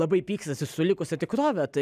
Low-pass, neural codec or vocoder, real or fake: 14.4 kHz; none; real